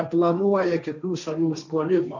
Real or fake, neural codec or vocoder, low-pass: fake; codec, 16 kHz, 1.1 kbps, Voila-Tokenizer; 7.2 kHz